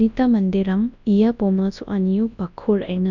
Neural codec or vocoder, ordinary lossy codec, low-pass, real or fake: codec, 24 kHz, 0.9 kbps, WavTokenizer, large speech release; none; 7.2 kHz; fake